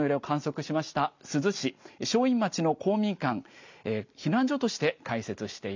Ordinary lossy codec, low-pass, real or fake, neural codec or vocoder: MP3, 64 kbps; 7.2 kHz; real; none